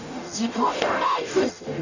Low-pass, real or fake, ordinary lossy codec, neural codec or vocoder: 7.2 kHz; fake; none; codec, 44.1 kHz, 0.9 kbps, DAC